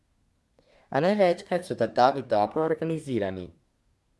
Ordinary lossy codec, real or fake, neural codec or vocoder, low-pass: none; fake; codec, 24 kHz, 1 kbps, SNAC; none